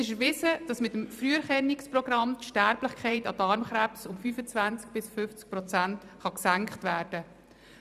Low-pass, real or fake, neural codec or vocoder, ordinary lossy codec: 14.4 kHz; fake; vocoder, 44.1 kHz, 128 mel bands every 512 samples, BigVGAN v2; none